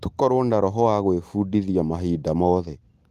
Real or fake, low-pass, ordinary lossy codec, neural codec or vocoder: real; 14.4 kHz; Opus, 24 kbps; none